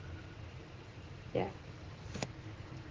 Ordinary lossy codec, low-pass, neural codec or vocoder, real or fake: Opus, 16 kbps; 7.2 kHz; none; real